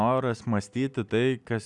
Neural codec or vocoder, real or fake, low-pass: none; real; 10.8 kHz